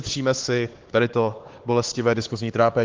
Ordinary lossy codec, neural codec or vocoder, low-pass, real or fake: Opus, 16 kbps; codec, 16 kHz, 4 kbps, X-Codec, HuBERT features, trained on LibriSpeech; 7.2 kHz; fake